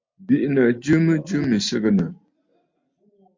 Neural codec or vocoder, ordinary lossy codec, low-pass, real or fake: none; MP3, 48 kbps; 7.2 kHz; real